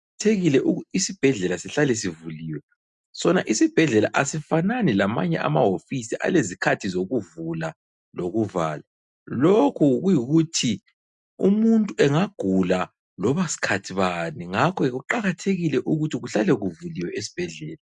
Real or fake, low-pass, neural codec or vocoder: real; 10.8 kHz; none